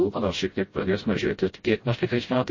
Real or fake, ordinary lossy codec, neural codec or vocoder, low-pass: fake; MP3, 32 kbps; codec, 16 kHz, 0.5 kbps, FreqCodec, smaller model; 7.2 kHz